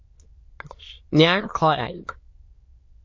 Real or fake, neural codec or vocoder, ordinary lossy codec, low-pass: fake; autoencoder, 22.05 kHz, a latent of 192 numbers a frame, VITS, trained on many speakers; MP3, 32 kbps; 7.2 kHz